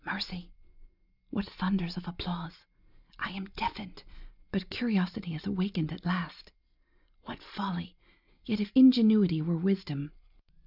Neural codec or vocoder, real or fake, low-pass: none; real; 5.4 kHz